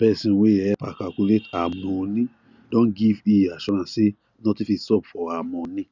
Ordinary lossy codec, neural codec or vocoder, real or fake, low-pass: none; none; real; 7.2 kHz